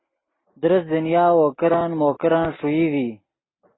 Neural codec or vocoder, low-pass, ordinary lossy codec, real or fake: none; 7.2 kHz; AAC, 16 kbps; real